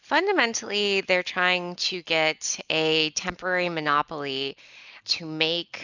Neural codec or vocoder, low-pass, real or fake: none; 7.2 kHz; real